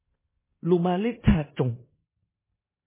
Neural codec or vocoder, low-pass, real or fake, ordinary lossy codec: codec, 16 kHz in and 24 kHz out, 0.9 kbps, LongCat-Audio-Codec, four codebook decoder; 3.6 kHz; fake; MP3, 16 kbps